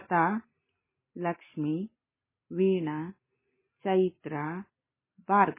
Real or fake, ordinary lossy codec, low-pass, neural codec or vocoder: real; MP3, 16 kbps; 3.6 kHz; none